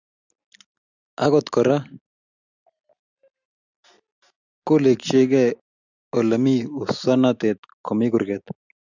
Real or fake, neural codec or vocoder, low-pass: real; none; 7.2 kHz